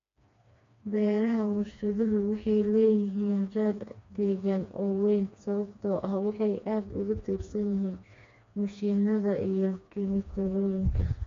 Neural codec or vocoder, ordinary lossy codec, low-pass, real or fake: codec, 16 kHz, 2 kbps, FreqCodec, smaller model; MP3, 48 kbps; 7.2 kHz; fake